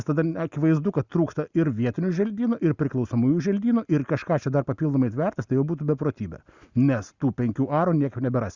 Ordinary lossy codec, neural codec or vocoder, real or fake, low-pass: Opus, 64 kbps; none; real; 7.2 kHz